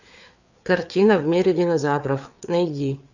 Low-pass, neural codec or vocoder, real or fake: 7.2 kHz; codec, 16 kHz, 4 kbps, FreqCodec, larger model; fake